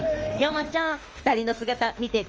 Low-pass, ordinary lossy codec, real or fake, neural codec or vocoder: 7.2 kHz; Opus, 24 kbps; fake; autoencoder, 48 kHz, 32 numbers a frame, DAC-VAE, trained on Japanese speech